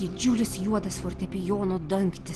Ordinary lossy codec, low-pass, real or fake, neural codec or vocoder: Opus, 16 kbps; 10.8 kHz; real; none